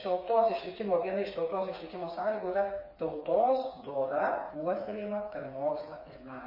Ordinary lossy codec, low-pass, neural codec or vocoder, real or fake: MP3, 24 kbps; 5.4 kHz; codec, 16 kHz, 4 kbps, FreqCodec, smaller model; fake